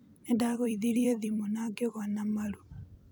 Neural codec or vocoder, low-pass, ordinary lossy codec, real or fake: vocoder, 44.1 kHz, 128 mel bands every 256 samples, BigVGAN v2; none; none; fake